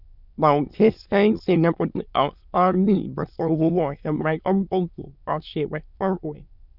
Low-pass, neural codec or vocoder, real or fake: 5.4 kHz; autoencoder, 22.05 kHz, a latent of 192 numbers a frame, VITS, trained on many speakers; fake